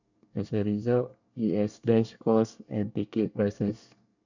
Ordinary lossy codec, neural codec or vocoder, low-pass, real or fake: none; codec, 24 kHz, 1 kbps, SNAC; 7.2 kHz; fake